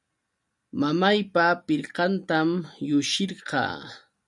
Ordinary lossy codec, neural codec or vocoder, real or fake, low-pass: MP3, 96 kbps; none; real; 10.8 kHz